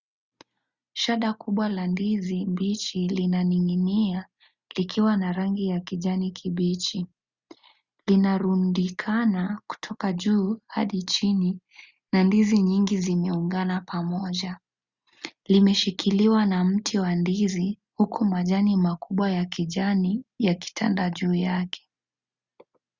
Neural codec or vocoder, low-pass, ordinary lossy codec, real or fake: none; 7.2 kHz; Opus, 64 kbps; real